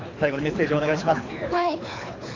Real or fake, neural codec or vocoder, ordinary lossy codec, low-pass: fake; codec, 24 kHz, 6 kbps, HILCodec; AAC, 32 kbps; 7.2 kHz